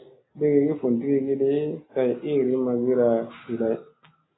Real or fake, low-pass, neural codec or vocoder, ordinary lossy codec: real; 7.2 kHz; none; AAC, 16 kbps